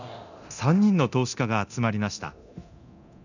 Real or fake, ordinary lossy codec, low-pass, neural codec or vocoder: fake; none; 7.2 kHz; codec, 24 kHz, 0.9 kbps, DualCodec